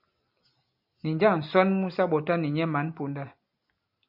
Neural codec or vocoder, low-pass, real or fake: none; 5.4 kHz; real